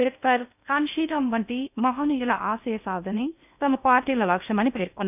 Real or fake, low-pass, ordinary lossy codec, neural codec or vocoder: fake; 3.6 kHz; none; codec, 16 kHz in and 24 kHz out, 0.6 kbps, FocalCodec, streaming, 4096 codes